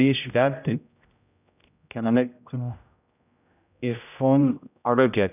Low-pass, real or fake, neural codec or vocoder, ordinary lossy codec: 3.6 kHz; fake; codec, 16 kHz, 0.5 kbps, X-Codec, HuBERT features, trained on general audio; none